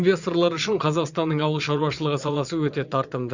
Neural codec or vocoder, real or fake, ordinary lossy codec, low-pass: vocoder, 22.05 kHz, 80 mel bands, Vocos; fake; Opus, 64 kbps; 7.2 kHz